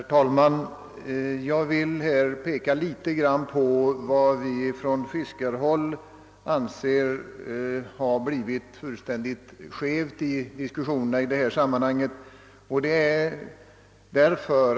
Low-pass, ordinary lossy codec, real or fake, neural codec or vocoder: none; none; real; none